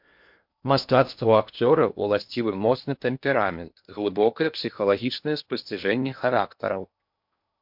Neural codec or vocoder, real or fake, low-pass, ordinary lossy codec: codec, 16 kHz in and 24 kHz out, 0.8 kbps, FocalCodec, streaming, 65536 codes; fake; 5.4 kHz; MP3, 48 kbps